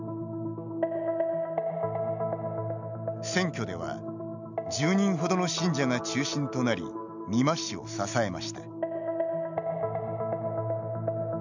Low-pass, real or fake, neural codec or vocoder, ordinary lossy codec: 7.2 kHz; real; none; none